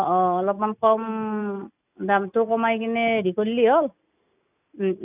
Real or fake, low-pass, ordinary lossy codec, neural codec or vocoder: real; 3.6 kHz; none; none